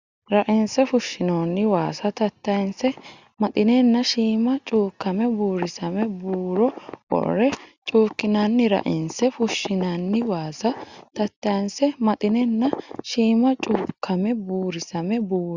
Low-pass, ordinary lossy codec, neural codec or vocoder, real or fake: 7.2 kHz; Opus, 64 kbps; none; real